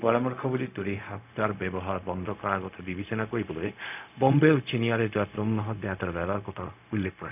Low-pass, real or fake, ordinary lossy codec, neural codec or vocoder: 3.6 kHz; fake; none; codec, 16 kHz, 0.4 kbps, LongCat-Audio-Codec